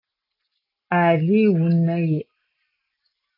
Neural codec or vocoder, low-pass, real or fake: none; 5.4 kHz; real